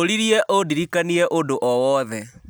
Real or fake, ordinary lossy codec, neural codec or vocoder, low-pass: real; none; none; none